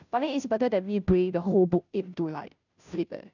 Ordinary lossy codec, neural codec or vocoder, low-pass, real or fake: none; codec, 16 kHz, 0.5 kbps, FunCodec, trained on Chinese and English, 25 frames a second; 7.2 kHz; fake